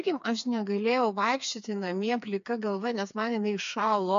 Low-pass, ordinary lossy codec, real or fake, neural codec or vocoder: 7.2 kHz; MP3, 64 kbps; fake; codec, 16 kHz, 4 kbps, FreqCodec, smaller model